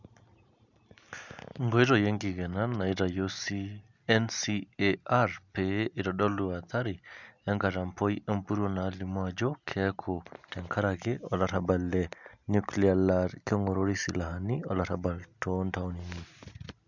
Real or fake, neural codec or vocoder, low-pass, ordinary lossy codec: real; none; 7.2 kHz; none